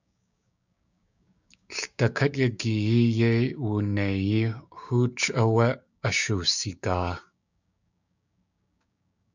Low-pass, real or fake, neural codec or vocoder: 7.2 kHz; fake; codec, 16 kHz, 6 kbps, DAC